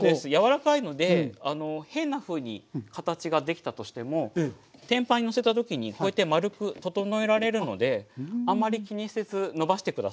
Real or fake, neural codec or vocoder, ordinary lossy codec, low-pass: real; none; none; none